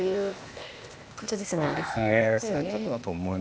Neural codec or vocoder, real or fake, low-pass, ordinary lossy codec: codec, 16 kHz, 0.8 kbps, ZipCodec; fake; none; none